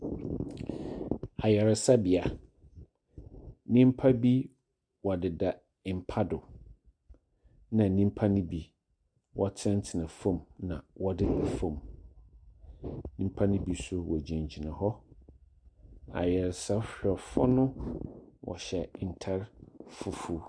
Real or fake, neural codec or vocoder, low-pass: real; none; 9.9 kHz